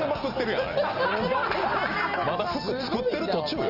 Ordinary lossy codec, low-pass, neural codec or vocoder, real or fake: Opus, 24 kbps; 5.4 kHz; none; real